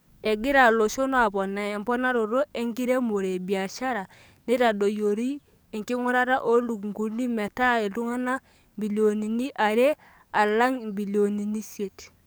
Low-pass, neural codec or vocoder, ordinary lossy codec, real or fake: none; codec, 44.1 kHz, 7.8 kbps, DAC; none; fake